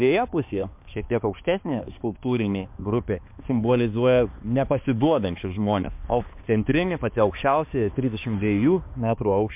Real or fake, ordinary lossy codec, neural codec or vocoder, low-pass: fake; MP3, 32 kbps; codec, 16 kHz, 2 kbps, X-Codec, HuBERT features, trained on balanced general audio; 3.6 kHz